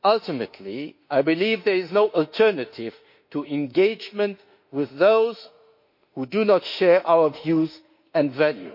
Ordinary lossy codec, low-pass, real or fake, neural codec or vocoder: MP3, 32 kbps; 5.4 kHz; fake; autoencoder, 48 kHz, 32 numbers a frame, DAC-VAE, trained on Japanese speech